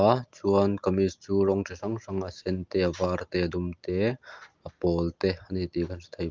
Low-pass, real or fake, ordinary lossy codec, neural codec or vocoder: 7.2 kHz; real; Opus, 32 kbps; none